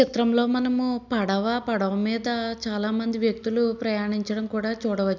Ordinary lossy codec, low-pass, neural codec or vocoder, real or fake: none; 7.2 kHz; none; real